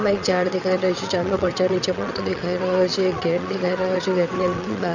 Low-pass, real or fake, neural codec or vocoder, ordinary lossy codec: 7.2 kHz; fake; vocoder, 22.05 kHz, 80 mel bands, WaveNeXt; none